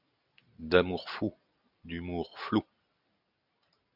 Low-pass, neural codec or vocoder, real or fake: 5.4 kHz; none; real